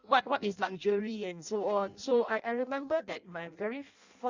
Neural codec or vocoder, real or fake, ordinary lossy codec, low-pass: codec, 16 kHz in and 24 kHz out, 0.6 kbps, FireRedTTS-2 codec; fake; Opus, 64 kbps; 7.2 kHz